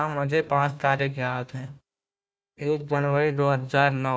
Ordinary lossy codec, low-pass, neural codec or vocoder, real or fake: none; none; codec, 16 kHz, 1 kbps, FunCodec, trained on Chinese and English, 50 frames a second; fake